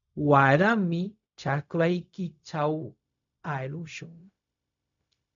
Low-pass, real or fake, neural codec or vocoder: 7.2 kHz; fake; codec, 16 kHz, 0.4 kbps, LongCat-Audio-Codec